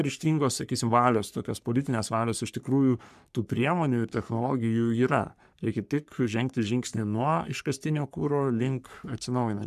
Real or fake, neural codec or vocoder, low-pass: fake; codec, 44.1 kHz, 3.4 kbps, Pupu-Codec; 14.4 kHz